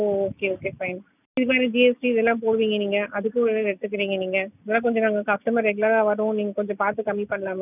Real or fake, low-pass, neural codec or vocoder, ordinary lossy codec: real; 3.6 kHz; none; none